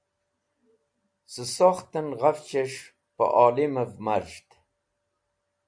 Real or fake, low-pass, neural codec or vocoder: real; 9.9 kHz; none